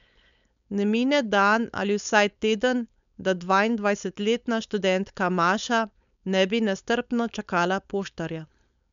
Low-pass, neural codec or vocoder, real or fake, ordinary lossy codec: 7.2 kHz; codec, 16 kHz, 4.8 kbps, FACodec; fake; none